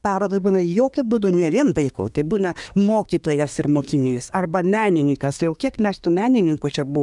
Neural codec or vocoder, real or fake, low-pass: codec, 24 kHz, 1 kbps, SNAC; fake; 10.8 kHz